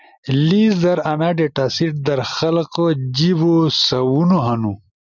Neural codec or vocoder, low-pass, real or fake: none; 7.2 kHz; real